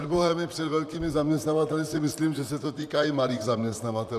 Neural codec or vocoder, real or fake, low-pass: vocoder, 44.1 kHz, 128 mel bands, Pupu-Vocoder; fake; 14.4 kHz